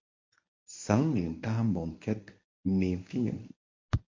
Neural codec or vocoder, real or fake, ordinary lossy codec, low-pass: codec, 24 kHz, 0.9 kbps, WavTokenizer, medium speech release version 1; fake; MP3, 48 kbps; 7.2 kHz